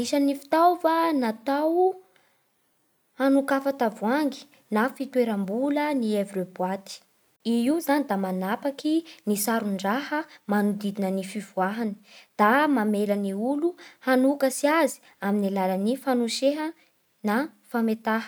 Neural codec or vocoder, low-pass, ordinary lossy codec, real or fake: none; none; none; real